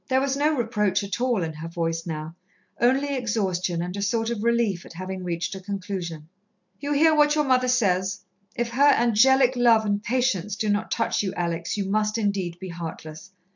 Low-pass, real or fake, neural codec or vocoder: 7.2 kHz; real; none